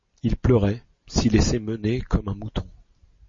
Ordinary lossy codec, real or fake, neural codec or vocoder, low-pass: MP3, 32 kbps; real; none; 7.2 kHz